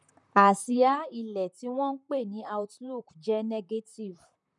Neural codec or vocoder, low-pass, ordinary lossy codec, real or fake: vocoder, 44.1 kHz, 128 mel bands, Pupu-Vocoder; 10.8 kHz; none; fake